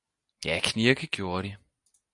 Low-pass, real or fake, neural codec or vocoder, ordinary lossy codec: 10.8 kHz; real; none; MP3, 96 kbps